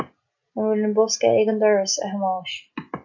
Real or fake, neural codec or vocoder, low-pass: real; none; 7.2 kHz